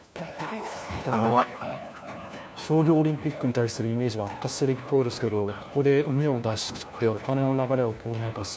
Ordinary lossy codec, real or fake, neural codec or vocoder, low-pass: none; fake; codec, 16 kHz, 1 kbps, FunCodec, trained on LibriTTS, 50 frames a second; none